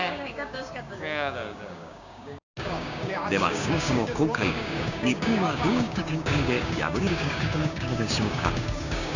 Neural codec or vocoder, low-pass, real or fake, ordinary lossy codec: codec, 16 kHz, 6 kbps, DAC; 7.2 kHz; fake; none